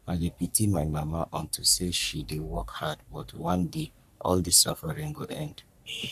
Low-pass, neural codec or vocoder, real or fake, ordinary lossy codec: 14.4 kHz; codec, 44.1 kHz, 3.4 kbps, Pupu-Codec; fake; none